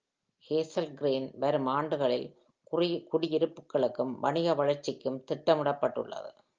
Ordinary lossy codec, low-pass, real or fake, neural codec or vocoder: Opus, 24 kbps; 7.2 kHz; real; none